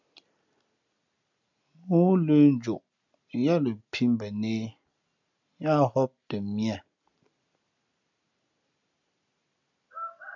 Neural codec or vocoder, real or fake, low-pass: none; real; 7.2 kHz